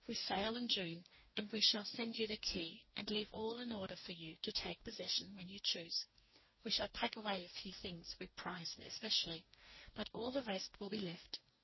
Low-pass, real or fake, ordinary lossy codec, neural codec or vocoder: 7.2 kHz; fake; MP3, 24 kbps; codec, 44.1 kHz, 2.6 kbps, DAC